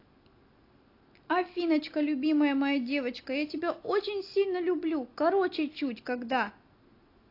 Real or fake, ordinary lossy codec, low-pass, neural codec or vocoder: real; AAC, 32 kbps; 5.4 kHz; none